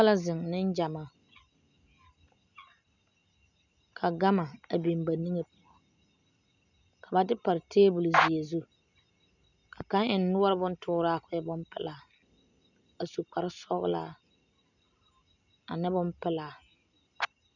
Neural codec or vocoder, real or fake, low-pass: none; real; 7.2 kHz